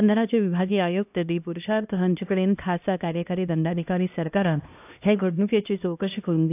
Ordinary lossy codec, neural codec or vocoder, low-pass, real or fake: AAC, 32 kbps; codec, 16 kHz, 1 kbps, X-Codec, HuBERT features, trained on LibriSpeech; 3.6 kHz; fake